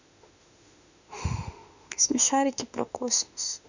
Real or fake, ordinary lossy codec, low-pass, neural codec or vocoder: fake; none; 7.2 kHz; autoencoder, 48 kHz, 32 numbers a frame, DAC-VAE, trained on Japanese speech